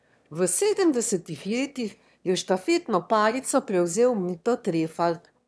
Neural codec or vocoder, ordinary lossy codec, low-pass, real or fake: autoencoder, 22.05 kHz, a latent of 192 numbers a frame, VITS, trained on one speaker; none; none; fake